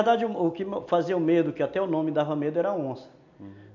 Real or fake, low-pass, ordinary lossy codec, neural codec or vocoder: real; 7.2 kHz; none; none